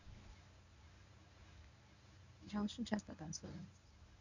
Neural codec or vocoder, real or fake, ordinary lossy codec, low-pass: codec, 24 kHz, 0.9 kbps, WavTokenizer, medium speech release version 1; fake; none; 7.2 kHz